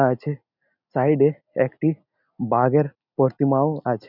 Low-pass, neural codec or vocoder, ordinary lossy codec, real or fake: 5.4 kHz; none; none; real